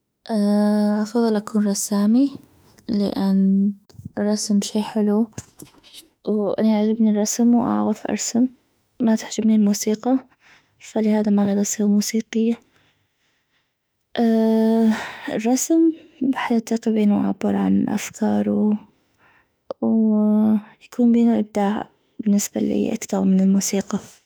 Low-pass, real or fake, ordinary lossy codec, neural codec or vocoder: none; fake; none; autoencoder, 48 kHz, 32 numbers a frame, DAC-VAE, trained on Japanese speech